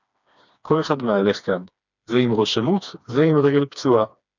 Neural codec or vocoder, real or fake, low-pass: codec, 16 kHz, 2 kbps, FreqCodec, smaller model; fake; 7.2 kHz